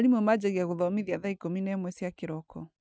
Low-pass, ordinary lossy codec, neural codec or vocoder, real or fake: none; none; none; real